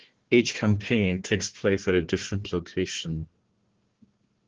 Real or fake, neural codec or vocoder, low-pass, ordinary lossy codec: fake; codec, 16 kHz, 1 kbps, FunCodec, trained on Chinese and English, 50 frames a second; 7.2 kHz; Opus, 16 kbps